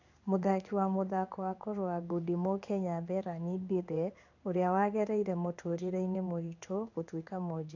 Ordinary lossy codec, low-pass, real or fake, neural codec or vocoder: none; 7.2 kHz; fake; codec, 16 kHz in and 24 kHz out, 1 kbps, XY-Tokenizer